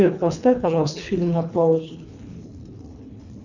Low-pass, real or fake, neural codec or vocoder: 7.2 kHz; fake; codec, 24 kHz, 3 kbps, HILCodec